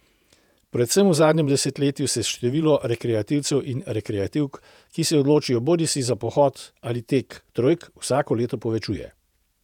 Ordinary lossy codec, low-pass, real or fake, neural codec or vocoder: none; 19.8 kHz; fake; vocoder, 48 kHz, 128 mel bands, Vocos